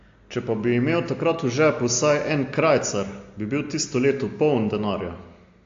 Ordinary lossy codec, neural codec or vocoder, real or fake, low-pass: AAC, 48 kbps; none; real; 7.2 kHz